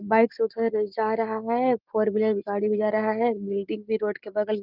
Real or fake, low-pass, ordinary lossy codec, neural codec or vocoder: real; 5.4 kHz; Opus, 32 kbps; none